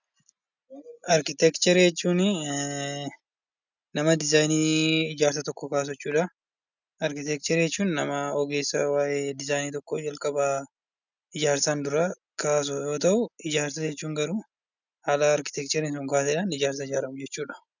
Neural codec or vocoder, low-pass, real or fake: none; 7.2 kHz; real